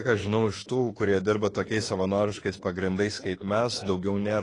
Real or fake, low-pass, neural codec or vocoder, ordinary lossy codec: fake; 10.8 kHz; autoencoder, 48 kHz, 32 numbers a frame, DAC-VAE, trained on Japanese speech; AAC, 32 kbps